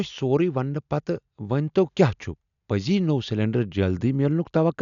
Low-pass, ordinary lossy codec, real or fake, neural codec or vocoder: 7.2 kHz; none; real; none